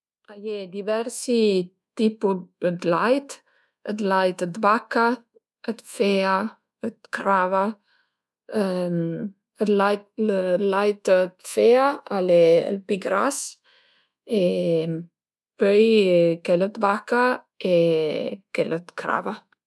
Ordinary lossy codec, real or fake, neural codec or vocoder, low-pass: none; fake; codec, 24 kHz, 1.2 kbps, DualCodec; none